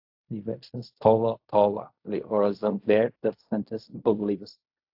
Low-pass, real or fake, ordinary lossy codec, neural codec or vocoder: 5.4 kHz; fake; AAC, 48 kbps; codec, 16 kHz in and 24 kHz out, 0.4 kbps, LongCat-Audio-Codec, fine tuned four codebook decoder